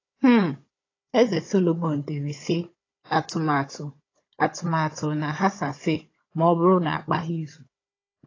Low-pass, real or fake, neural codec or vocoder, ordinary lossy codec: 7.2 kHz; fake; codec, 16 kHz, 16 kbps, FunCodec, trained on Chinese and English, 50 frames a second; AAC, 32 kbps